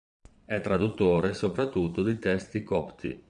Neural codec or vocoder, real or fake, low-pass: vocoder, 22.05 kHz, 80 mel bands, Vocos; fake; 9.9 kHz